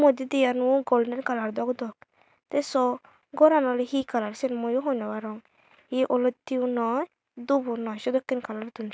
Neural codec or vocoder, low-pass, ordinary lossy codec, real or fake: none; none; none; real